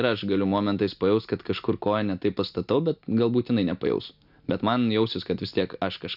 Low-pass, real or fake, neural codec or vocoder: 5.4 kHz; real; none